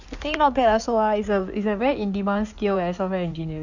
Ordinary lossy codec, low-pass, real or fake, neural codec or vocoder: none; 7.2 kHz; fake; codec, 16 kHz in and 24 kHz out, 2.2 kbps, FireRedTTS-2 codec